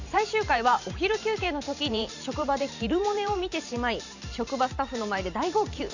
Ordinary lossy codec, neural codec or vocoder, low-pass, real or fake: none; vocoder, 44.1 kHz, 128 mel bands every 512 samples, BigVGAN v2; 7.2 kHz; fake